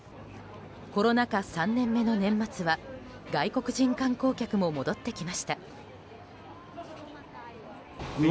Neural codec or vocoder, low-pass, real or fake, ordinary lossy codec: none; none; real; none